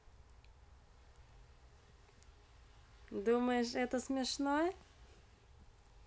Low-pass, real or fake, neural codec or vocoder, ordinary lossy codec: none; real; none; none